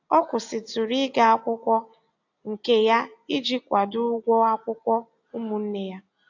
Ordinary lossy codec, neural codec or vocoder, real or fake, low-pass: AAC, 48 kbps; none; real; 7.2 kHz